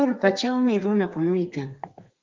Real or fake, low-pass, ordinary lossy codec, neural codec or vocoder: fake; 7.2 kHz; Opus, 32 kbps; codec, 32 kHz, 1.9 kbps, SNAC